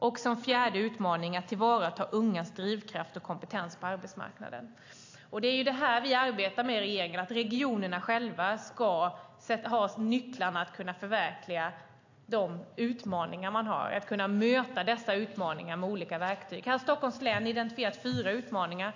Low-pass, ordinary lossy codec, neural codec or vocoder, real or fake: 7.2 kHz; AAC, 48 kbps; none; real